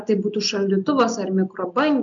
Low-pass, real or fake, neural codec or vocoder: 7.2 kHz; real; none